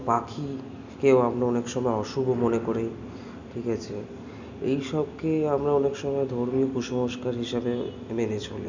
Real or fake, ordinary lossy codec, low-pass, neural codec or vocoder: real; none; 7.2 kHz; none